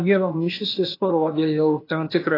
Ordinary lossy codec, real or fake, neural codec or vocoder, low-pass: AAC, 32 kbps; fake; codec, 16 kHz, 0.8 kbps, ZipCodec; 5.4 kHz